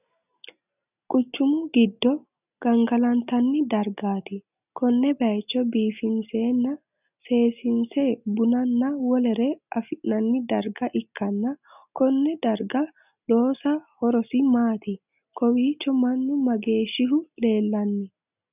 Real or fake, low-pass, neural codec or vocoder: real; 3.6 kHz; none